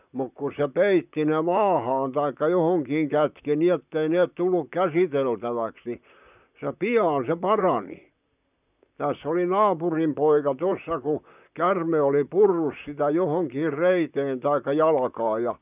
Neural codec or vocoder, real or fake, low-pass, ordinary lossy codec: vocoder, 44.1 kHz, 128 mel bands, Pupu-Vocoder; fake; 3.6 kHz; none